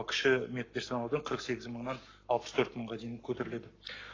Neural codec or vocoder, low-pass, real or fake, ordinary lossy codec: codec, 44.1 kHz, 7.8 kbps, Pupu-Codec; 7.2 kHz; fake; AAC, 32 kbps